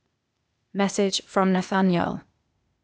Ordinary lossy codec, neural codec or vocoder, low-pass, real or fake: none; codec, 16 kHz, 0.8 kbps, ZipCodec; none; fake